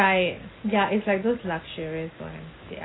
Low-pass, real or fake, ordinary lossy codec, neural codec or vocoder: 7.2 kHz; real; AAC, 16 kbps; none